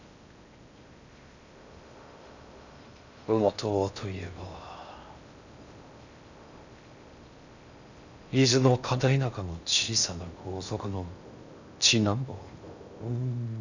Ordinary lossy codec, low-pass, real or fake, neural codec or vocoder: none; 7.2 kHz; fake; codec, 16 kHz in and 24 kHz out, 0.6 kbps, FocalCodec, streaming, 2048 codes